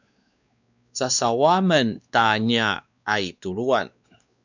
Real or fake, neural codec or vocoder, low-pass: fake; codec, 16 kHz, 2 kbps, X-Codec, WavLM features, trained on Multilingual LibriSpeech; 7.2 kHz